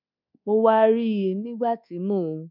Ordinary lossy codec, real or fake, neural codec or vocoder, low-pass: none; fake; codec, 24 kHz, 1.2 kbps, DualCodec; 5.4 kHz